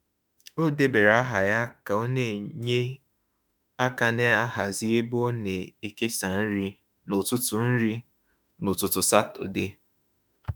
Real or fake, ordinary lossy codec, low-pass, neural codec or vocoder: fake; none; 19.8 kHz; autoencoder, 48 kHz, 32 numbers a frame, DAC-VAE, trained on Japanese speech